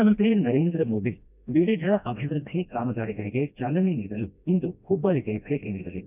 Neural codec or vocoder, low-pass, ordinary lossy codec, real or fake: codec, 16 kHz, 1 kbps, FreqCodec, smaller model; 3.6 kHz; none; fake